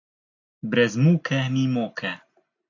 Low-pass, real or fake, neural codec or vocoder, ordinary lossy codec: 7.2 kHz; real; none; AAC, 48 kbps